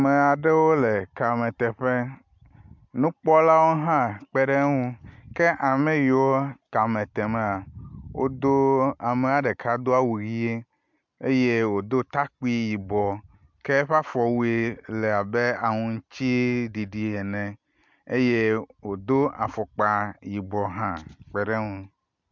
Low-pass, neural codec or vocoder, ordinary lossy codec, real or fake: 7.2 kHz; none; MP3, 64 kbps; real